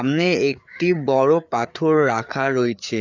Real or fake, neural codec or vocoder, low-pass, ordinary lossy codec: fake; codec, 16 kHz, 4 kbps, FreqCodec, larger model; 7.2 kHz; none